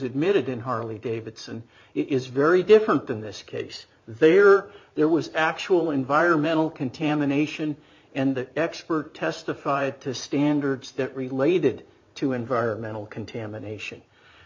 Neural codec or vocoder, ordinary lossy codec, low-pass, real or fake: vocoder, 44.1 kHz, 128 mel bands every 512 samples, BigVGAN v2; MP3, 48 kbps; 7.2 kHz; fake